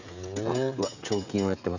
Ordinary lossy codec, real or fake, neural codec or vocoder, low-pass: none; fake; codec, 16 kHz, 16 kbps, FreqCodec, smaller model; 7.2 kHz